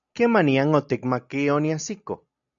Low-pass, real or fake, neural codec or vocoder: 7.2 kHz; real; none